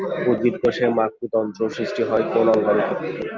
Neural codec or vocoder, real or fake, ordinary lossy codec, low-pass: none; real; Opus, 24 kbps; 7.2 kHz